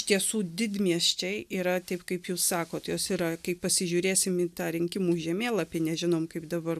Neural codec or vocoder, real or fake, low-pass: none; real; 14.4 kHz